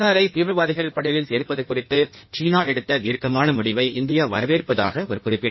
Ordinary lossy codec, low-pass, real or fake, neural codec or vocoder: MP3, 24 kbps; 7.2 kHz; fake; codec, 16 kHz in and 24 kHz out, 1.1 kbps, FireRedTTS-2 codec